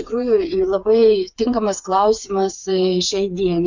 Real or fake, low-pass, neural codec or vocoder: fake; 7.2 kHz; codec, 16 kHz, 4 kbps, FreqCodec, smaller model